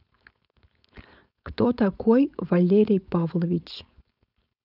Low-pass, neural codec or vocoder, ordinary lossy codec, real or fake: 5.4 kHz; codec, 16 kHz, 4.8 kbps, FACodec; none; fake